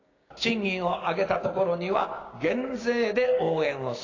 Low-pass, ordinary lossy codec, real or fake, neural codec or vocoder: 7.2 kHz; AAC, 32 kbps; fake; codec, 16 kHz, 6 kbps, DAC